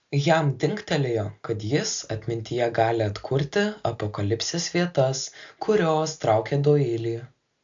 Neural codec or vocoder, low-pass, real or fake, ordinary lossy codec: none; 7.2 kHz; real; AAC, 64 kbps